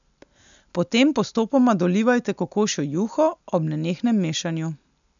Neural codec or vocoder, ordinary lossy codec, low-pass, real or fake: none; none; 7.2 kHz; real